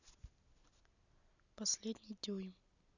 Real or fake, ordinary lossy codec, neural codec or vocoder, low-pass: real; none; none; 7.2 kHz